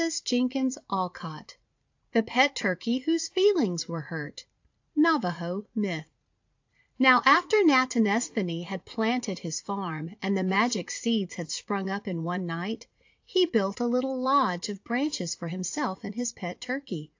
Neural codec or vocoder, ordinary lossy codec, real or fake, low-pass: vocoder, 44.1 kHz, 80 mel bands, Vocos; AAC, 48 kbps; fake; 7.2 kHz